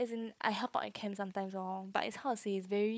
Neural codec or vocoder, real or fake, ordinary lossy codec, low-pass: codec, 16 kHz, 4 kbps, FunCodec, trained on Chinese and English, 50 frames a second; fake; none; none